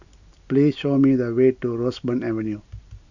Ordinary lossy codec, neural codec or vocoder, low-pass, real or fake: none; none; 7.2 kHz; real